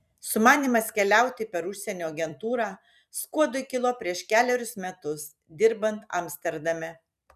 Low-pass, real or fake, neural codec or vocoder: 14.4 kHz; fake; vocoder, 44.1 kHz, 128 mel bands every 256 samples, BigVGAN v2